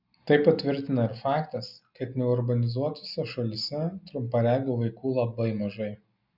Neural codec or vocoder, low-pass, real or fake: none; 5.4 kHz; real